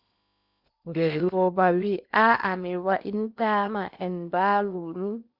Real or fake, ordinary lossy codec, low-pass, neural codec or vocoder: fake; Opus, 64 kbps; 5.4 kHz; codec, 16 kHz in and 24 kHz out, 0.8 kbps, FocalCodec, streaming, 65536 codes